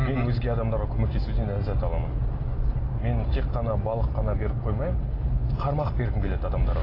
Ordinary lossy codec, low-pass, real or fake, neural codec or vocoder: AAC, 24 kbps; 5.4 kHz; real; none